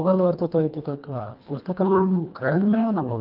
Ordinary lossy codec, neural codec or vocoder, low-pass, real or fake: Opus, 32 kbps; codec, 24 kHz, 1.5 kbps, HILCodec; 5.4 kHz; fake